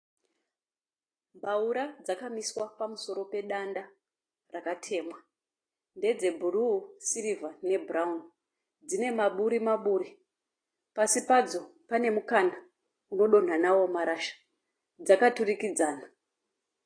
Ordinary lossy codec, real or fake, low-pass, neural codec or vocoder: AAC, 32 kbps; real; 9.9 kHz; none